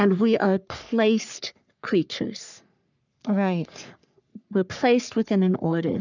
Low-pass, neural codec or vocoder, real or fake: 7.2 kHz; codec, 44.1 kHz, 3.4 kbps, Pupu-Codec; fake